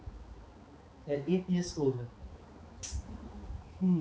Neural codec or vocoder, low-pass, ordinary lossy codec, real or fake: codec, 16 kHz, 4 kbps, X-Codec, HuBERT features, trained on balanced general audio; none; none; fake